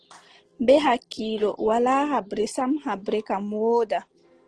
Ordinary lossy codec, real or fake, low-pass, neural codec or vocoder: Opus, 16 kbps; real; 9.9 kHz; none